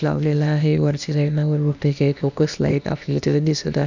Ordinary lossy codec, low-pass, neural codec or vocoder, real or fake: none; 7.2 kHz; codec, 16 kHz, 0.8 kbps, ZipCodec; fake